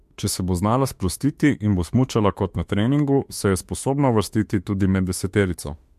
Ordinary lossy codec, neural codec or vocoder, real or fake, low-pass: MP3, 64 kbps; autoencoder, 48 kHz, 32 numbers a frame, DAC-VAE, trained on Japanese speech; fake; 14.4 kHz